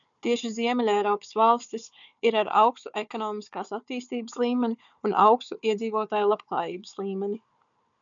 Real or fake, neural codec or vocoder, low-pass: fake; codec, 16 kHz, 4 kbps, FunCodec, trained on Chinese and English, 50 frames a second; 7.2 kHz